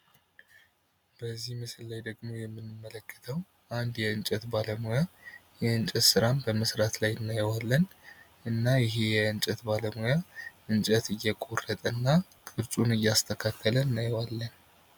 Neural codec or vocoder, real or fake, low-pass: none; real; 19.8 kHz